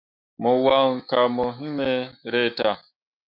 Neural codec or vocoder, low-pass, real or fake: autoencoder, 48 kHz, 128 numbers a frame, DAC-VAE, trained on Japanese speech; 5.4 kHz; fake